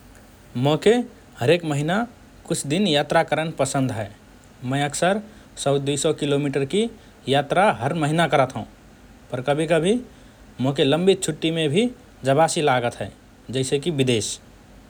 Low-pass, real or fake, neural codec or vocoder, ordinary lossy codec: none; real; none; none